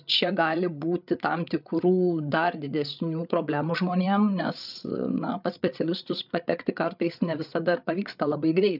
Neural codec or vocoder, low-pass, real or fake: codec, 16 kHz, 8 kbps, FreqCodec, larger model; 5.4 kHz; fake